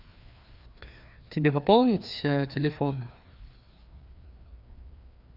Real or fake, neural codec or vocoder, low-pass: fake; codec, 16 kHz, 2 kbps, FreqCodec, larger model; 5.4 kHz